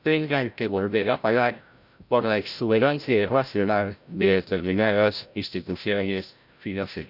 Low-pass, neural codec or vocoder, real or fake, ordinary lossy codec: 5.4 kHz; codec, 16 kHz, 0.5 kbps, FreqCodec, larger model; fake; none